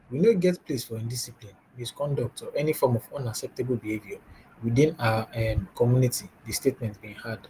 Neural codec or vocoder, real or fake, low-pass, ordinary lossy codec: none; real; 14.4 kHz; Opus, 24 kbps